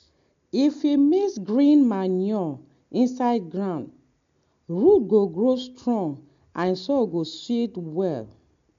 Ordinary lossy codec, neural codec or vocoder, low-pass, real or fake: MP3, 64 kbps; none; 7.2 kHz; real